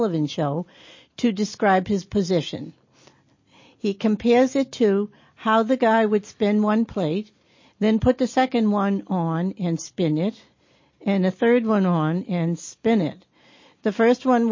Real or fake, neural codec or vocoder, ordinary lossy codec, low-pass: real; none; MP3, 32 kbps; 7.2 kHz